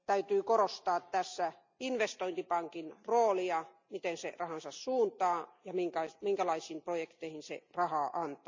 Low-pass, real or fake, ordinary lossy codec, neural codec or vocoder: 7.2 kHz; real; MP3, 64 kbps; none